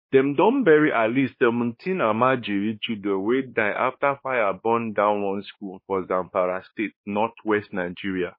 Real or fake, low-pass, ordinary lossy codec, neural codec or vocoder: fake; 5.4 kHz; MP3, 24 kbps; codec, 16 kHz, 2 kbps, X-Codec, WavLM features, trained on Multilingual LibriSpeech